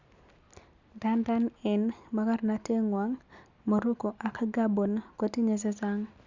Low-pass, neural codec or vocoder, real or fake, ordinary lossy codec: 7.2 kHz; none; real; Opus, 64 kbps